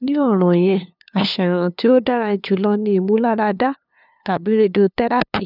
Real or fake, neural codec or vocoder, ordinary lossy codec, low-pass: fake; codec, 16 kHz, 2 kbps, FunCodec, trained on LibriTTS, 25 frames a second; none; 5.4 kHz